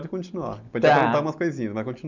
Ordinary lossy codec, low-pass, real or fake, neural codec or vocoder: none; 7.2 kHz; real; none